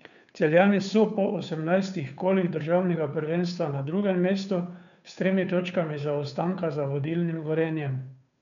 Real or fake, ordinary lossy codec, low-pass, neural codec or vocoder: fake; none; 7.2 kHz; codec, 16 kHz, 2 kbps, FunCodec, trained on Chinese and English, 25 frames a second